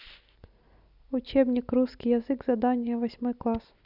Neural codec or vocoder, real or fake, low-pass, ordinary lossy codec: none; real; 5.4 kHz; none